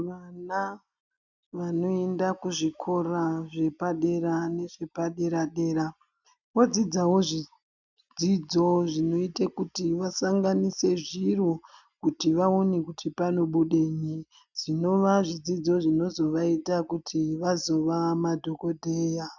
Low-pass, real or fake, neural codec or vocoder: 7.2 kHz; real; none